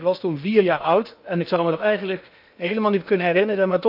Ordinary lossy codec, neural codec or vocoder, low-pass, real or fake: none; codec, 16 kHz in and 24 kHz out, 0.8 kbps, FocalCodec, streaming, 65536 codes; 5.4 kHz; fake